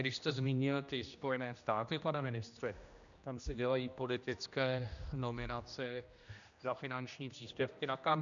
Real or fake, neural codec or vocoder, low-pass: fake; codec, 16 kHz, 1 kbps, X-Codec, HuBERT features, trained on general audio; 7.2 kHz